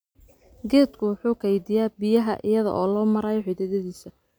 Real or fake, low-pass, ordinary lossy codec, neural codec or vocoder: real; none; none; none